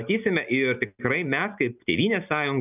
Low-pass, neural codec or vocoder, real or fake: 3.6 kHz; none; real